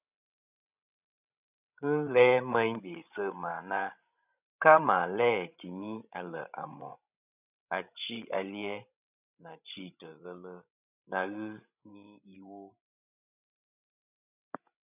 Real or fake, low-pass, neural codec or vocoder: fake; 3.6 kHz; codec, 16 kHz, 16 kbps, FreqCodec, larger model